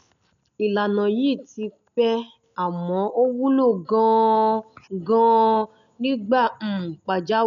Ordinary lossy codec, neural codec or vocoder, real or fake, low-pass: none; none; real; 7.2 kHz